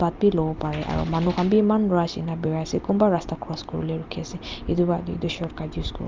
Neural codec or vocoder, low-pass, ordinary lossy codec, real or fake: none; 7.2 kHz; Opus, 24 kbps; real